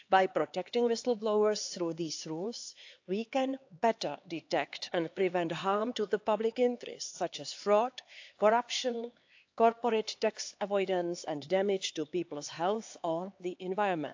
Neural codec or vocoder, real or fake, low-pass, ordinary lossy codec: codec, 16 kHz, 4 kbps, X-Codec, HuBERT features, trained on LibriSpeech; fake; 7.2 kHz; AAC, 48 kbps